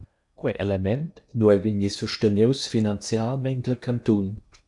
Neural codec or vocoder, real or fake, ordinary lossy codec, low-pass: codec, 16 kHz in and 24 kHz out, 0.8 kbps, FocalCodec, streaming, 65536 codes; fake; MP3, 96 kbps; 10.8 kHz